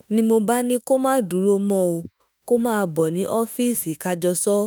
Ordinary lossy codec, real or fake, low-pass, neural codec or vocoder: none; fake; none; autoencoder, 48 kHz, 32 numbers a frame, DAC-VAE, trained on Japanese speech